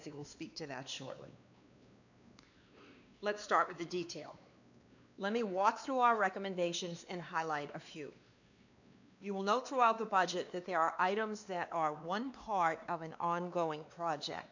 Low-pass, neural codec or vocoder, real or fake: 7.2 kHz; codec, 16 kHz, 2 kbps, X-Codec, WavLM features, trained on Multilingual LibriSpeech; fake